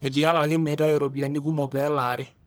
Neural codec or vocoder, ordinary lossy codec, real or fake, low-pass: codec, 44.1 kHz, 1.7 kbps, Pupu-Codec; none; fake; none